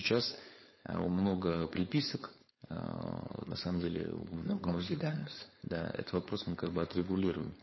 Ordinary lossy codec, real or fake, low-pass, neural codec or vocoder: MP3, 24 kbps; fake; 7.2 kHz; codec, 16 kHz, 4.8 kbps, FACodec